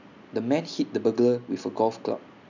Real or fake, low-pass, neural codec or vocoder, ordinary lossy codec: real; 7.2 kHz; none; none